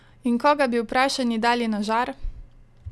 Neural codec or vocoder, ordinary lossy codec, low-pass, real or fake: none; none; none; real